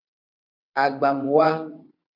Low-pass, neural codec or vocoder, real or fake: 5.4 kHz; vocoder, 22.05 kHz, 80 mel bands, WaveNeXt; fake